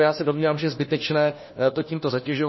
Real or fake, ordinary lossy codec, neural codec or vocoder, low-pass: fake; MP3, 24 kbps; codec, 16 kHz, 1 kbps, FunCodec, trained on LibriTTS, 50 frames a second; 7.2 kHz